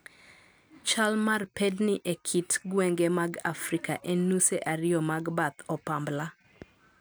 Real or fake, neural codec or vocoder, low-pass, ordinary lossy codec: real; none; none; none